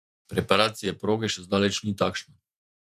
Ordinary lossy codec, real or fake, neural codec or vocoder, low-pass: none; fake; vocoder, 48 kHz, 128 mel bands, Vocos; 14.4 kHz